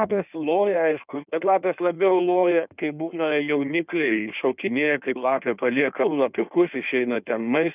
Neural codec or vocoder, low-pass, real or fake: codec, 16 kHz in and 24 kHz out, 1.1 kbps, FireRedTTS-2 codec; 3.6 kHz; fake